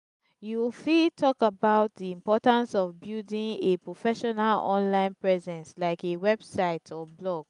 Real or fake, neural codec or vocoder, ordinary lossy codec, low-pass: real; none; none; 10.8 kHz